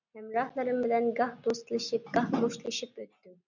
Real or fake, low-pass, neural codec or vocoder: real; 7.2 kHz; none